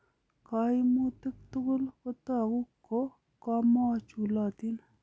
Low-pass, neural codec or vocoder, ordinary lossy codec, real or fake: none; none; none; real